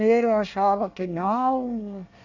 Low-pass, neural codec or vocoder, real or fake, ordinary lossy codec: 7.2 kHz; codec, 24 kHz, 1 kbps, SNAC; fake; none